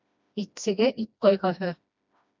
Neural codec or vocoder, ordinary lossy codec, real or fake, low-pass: codec, 16 kHz, 1 kbps, FreqCodec, smaller model; MP3, 64 kbps; fake; 7.2 kHz